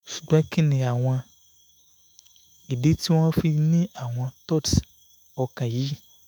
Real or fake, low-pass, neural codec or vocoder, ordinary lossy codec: fake; none; autoencoder, 48 kHz, 128 numbers a frame, DAC-VAE, trained on Japanese speech; none